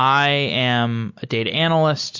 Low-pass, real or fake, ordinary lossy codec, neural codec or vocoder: 7.2 kHz; real; MP3, 48 kbps; none